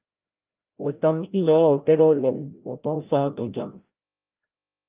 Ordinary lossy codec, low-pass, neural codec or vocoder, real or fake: Opus, 24 kbps; 3.6 kHz; codec, 16 kHz, 0.5 kbps, FreqCodec, larger model; fake